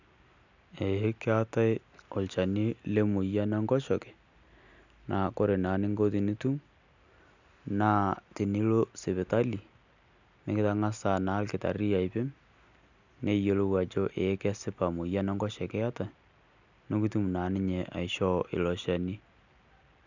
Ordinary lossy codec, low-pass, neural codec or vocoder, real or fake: none; 7.2 kHz; none; real